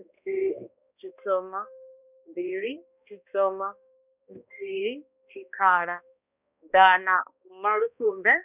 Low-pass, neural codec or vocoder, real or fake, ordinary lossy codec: 3.6 kHz; codec, 16 kHz, 1 kbps, X-Codec, HuBERT features, trained on balanced general audio; fake; none